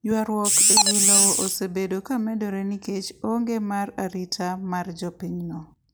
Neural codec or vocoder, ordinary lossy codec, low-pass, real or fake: none; none; none; real